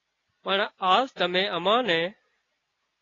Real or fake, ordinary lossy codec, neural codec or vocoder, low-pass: real; AAC, 32 kbps; none; 7.2 kHz